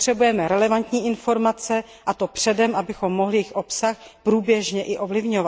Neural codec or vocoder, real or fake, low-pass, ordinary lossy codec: none; real; none; none